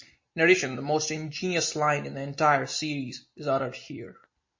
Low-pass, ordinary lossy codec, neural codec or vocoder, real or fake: 7.2 kHz; MP3, 32 kbps; none; real